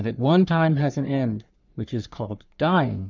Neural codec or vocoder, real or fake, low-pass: codec, 44.1 kHz, 3.4 kbps, Pupu-Codec; fake; 7.2 kHz